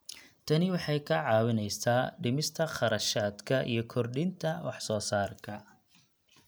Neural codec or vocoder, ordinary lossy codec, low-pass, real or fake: none; none; none; real